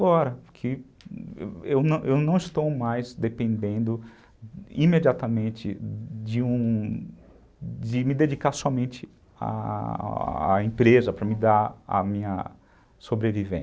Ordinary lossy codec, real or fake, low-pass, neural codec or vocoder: none; real; none; none